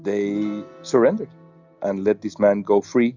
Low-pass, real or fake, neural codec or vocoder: 7.2 kHz; real; none